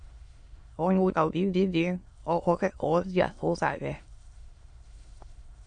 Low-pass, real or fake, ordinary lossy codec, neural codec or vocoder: 9.9 kHz; fake; MP3, 48 kbps; autoencoder, 22.05 kHz, a latent of 192 numbers a frame, VITS, trained on many speakers